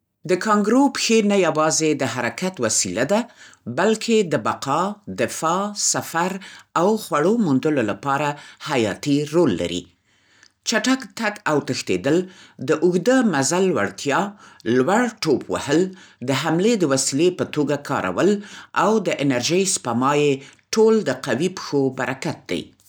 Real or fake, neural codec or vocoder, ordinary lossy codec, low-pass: real; none; none; none